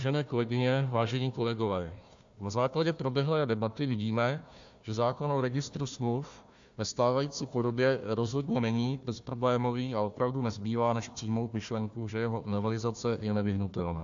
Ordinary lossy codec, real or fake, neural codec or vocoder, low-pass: AAC, 64 kbps; fake; codec, 16 kHz, 1 kbps, FunCodec, trained on Chinese and English, 50 frames a second; 7.2 kHz